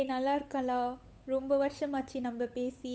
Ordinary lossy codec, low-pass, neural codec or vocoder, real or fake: none; none; codec, 16 kHz, 8 kbps, FunCodec, trained on Chinese and English, 25 frames a second; fake